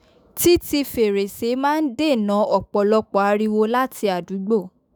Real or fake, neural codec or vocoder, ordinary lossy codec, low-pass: fake; autoencoder, 48 kHz, 128 numbers a frame, DAC-VAE, trained on Japanese speech; none; none